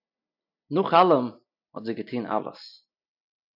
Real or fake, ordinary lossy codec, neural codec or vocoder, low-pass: real; AAC, 48 kbps; none; 5.4 kHz